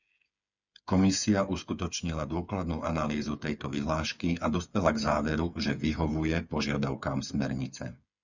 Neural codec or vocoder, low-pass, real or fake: codec, 16 kHz, 8 kbps, FreqCodec, smaller model; 7.2 kHz; fake